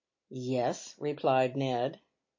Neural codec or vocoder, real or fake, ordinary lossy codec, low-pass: none; real; MP3, 32 kbps; 7.2 kHz